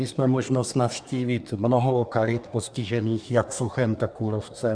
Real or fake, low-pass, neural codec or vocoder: fake; 9.9 kHz; codec, 24 kHz, 1 kbps, SNAC